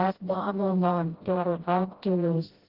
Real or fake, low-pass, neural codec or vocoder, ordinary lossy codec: fake; 5.4 kHz; codec, 16 kHz, 0.5 kbps, FreqCodec, smaller model; Opus, 16 kbps